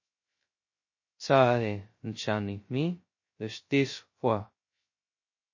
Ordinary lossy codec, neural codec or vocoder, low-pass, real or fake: MP3, 32 kbps; codec, 16 kHz, 0.2 kbps, FocalCodec; 7.2 kHz; fake